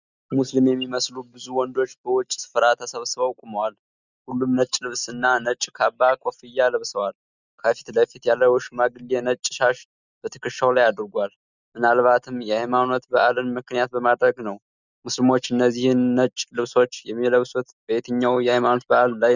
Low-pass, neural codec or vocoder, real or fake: 7.2 kHz; none; real